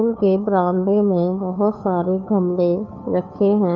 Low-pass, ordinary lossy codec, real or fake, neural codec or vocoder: 7.2 kHz; none; fake; codec, 16 kHz, 2 kbps, FunCodec, trained on Chinese and English, 25 frames a second